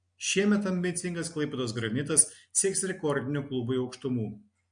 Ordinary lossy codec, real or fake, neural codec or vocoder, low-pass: MP3, 48 kbps; real; none; 9.9 kHz